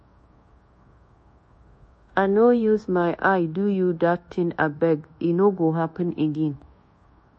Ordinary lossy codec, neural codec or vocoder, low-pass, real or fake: MP3, 32 kbps; codec, 24 kHz, 1.2 kbps, DualCodec; 10.8 kHz; fake